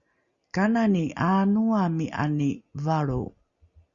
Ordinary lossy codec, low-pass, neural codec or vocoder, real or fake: Opus, 64 kbps; 7.2 kHz; none; real